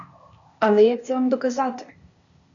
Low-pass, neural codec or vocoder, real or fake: 7.2 kHz; codec, 16 kHz, 0.8 kbps, ZipCodec; fake